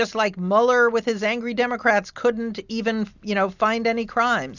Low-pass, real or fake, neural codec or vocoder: 7.2 kHz; real; none